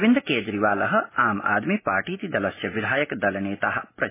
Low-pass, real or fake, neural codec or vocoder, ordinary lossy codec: 3.6 kHz; real; none; MP3, 16 kbps